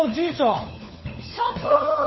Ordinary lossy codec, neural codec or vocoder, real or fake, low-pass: MP3, 24 kbps; codec, 16 kHz, 4 kbps, FunCodec, trained on LibriTTS, 50 frames a second; fake; 7.2 kHz